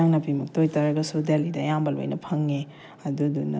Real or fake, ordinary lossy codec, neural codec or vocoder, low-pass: real; none; none; none